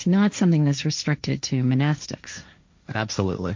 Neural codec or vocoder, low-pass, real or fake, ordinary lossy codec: codec, 16 kHz, 1.1 kbps, Voila-Tokenizer; 7.2 kHz; fake; MP3, 48 kbps